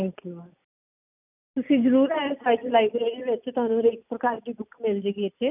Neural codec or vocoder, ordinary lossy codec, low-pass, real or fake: none; none; 3.6 kHz; real